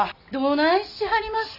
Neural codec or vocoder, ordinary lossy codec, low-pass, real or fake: none; none; 5.4 kHz; real